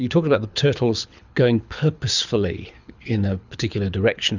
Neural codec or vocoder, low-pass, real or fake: codec, 24 kHz, 6 kbps, HILCodec; 7.2 kHz; fake